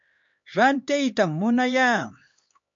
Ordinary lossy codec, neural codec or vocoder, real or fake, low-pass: MP3, 48 kbps; codec, 16 kHz, 2 kbps, X-Codec, HuBERT features, trained on LibriSpeech; fake; 7.2 kHz